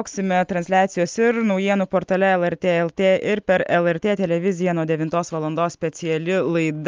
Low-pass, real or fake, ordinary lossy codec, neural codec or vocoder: 7.2 kHz; real; Opus, 32 kbps; none